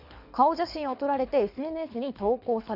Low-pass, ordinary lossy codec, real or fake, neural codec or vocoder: 5.4 kHz; none; fake; codec, 24 kHz, 6 kbps, HILCodec